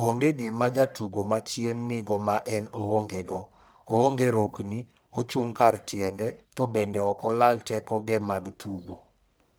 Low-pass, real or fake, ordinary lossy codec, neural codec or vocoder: none; fake; none; codec, 44.1 kHz, 1.7 kbps, Pupu-Codec